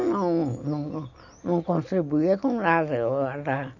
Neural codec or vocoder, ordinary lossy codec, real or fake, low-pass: none; none; real; 7.2 kHz